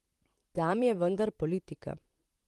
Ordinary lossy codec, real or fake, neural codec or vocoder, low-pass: Opus, 24 kbps; fake; vocoder, 44.1 kHz, 128 mel bands, Pupu-Vocoder; 14.4 kHz